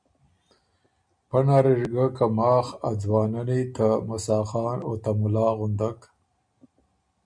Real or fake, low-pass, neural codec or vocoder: real; 9.9 kHz; none